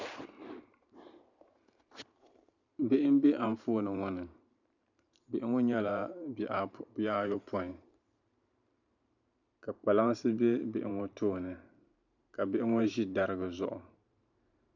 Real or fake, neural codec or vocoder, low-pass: fake; vocoder, 44.1 kHz, 128 mel bands every 512 samples, BigVGAN v2; 7.2 kHz